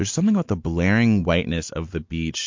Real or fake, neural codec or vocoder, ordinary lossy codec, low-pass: real; none; MP3, 48 kbps; 7.2 kHz